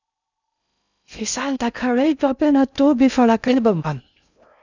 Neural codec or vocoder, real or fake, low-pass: codec, 16 kHz in and 24 kHz out, 0.6 kbps, FocalCodec, streaming, 2048 codes; fake; 7.2 kHz